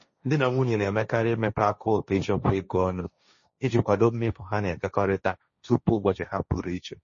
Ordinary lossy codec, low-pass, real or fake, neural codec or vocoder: MP3, 32 kbps; 7.2 kHz; fake; codec, 16 kHz, 1.1 kbps, Voila-Tokenizer